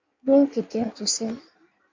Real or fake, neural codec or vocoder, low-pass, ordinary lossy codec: fake; codec, 16 kHz in and 24 kHz out, 1.1 kbps, FireRedTTS-2 codec; 7.2 kHz; MP3, 48 kbps